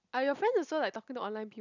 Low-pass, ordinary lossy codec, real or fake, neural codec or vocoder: 7.2 kHz; none; real; none